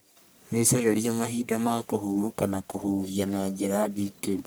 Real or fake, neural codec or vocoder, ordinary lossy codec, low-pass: fake; codec, 44.1 kHz, 1.7 kbps, Pupu-Codec; none; none